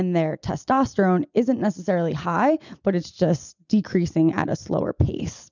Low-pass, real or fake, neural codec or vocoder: 7.2 kHz; real; none